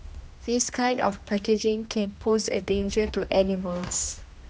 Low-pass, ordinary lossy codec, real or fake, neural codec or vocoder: none; none; fake; codec, 16 kHz, 1 kbps, X-Codec, HuBERT features, trained on general audio